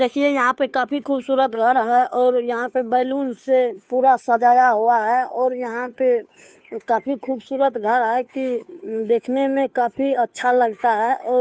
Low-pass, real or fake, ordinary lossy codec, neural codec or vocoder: none; fake; none; codec, 16 kHz, 2 kbps, FunCodec, trained on Chinese and English, 25 frames a second